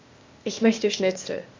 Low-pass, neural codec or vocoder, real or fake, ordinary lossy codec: 7.2 kHz; codec, 16 kHz, 0.8 kbps, ZipCodec; fake; MP3, 64 kbps